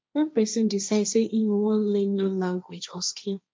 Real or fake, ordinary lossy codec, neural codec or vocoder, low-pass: fake; none; codec, 16 kHz, 1.1 kbps, Voila-Tokenizer; none